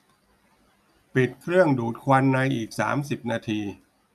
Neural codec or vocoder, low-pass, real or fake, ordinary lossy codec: none; 14.4 kHz; real; none